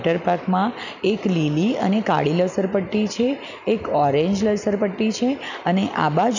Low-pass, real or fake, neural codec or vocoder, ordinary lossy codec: 7.2 kHz; real; none; none